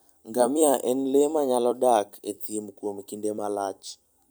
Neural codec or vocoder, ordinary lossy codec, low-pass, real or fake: vocoder, 44.1 kHz, 128 mel bands every 256 samples, BigVGAN v2; none; none; fake